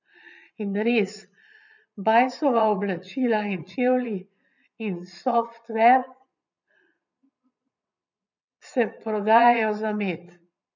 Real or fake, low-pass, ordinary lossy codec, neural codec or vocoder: fake; 7.2 kHz; none; vocoder, 22.05 kHz, 80 mel bands, Vocos